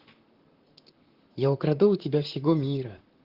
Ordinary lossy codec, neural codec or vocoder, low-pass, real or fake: Opus, 16 kbps; vocoder, 44.1 kHz, 128 mel bands, Pupu-Vocoder; 5.4 kHz; fake